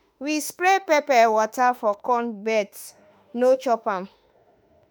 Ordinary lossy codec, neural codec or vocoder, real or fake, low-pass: none; autoencoder, 48 kHz, 32 numbers a frame, DAC-VAE, trained on Japanese speech; fake; none